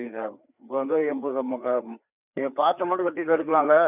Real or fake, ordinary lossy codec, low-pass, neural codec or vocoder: fake; none; 3.6 kHz; codec, 16 kHz, 4 kbps, FreqCodec, larger model